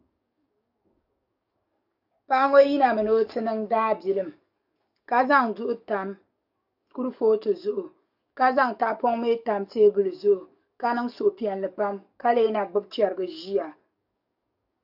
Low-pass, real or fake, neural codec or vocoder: 5.4 kHz; fake; codec, 44.1 kHz, 7.8 kbps, DAC